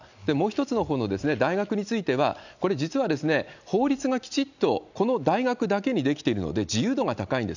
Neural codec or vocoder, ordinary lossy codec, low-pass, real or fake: none; none; 7.2 kHz; real